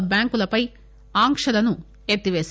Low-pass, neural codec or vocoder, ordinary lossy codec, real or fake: none; none; none; real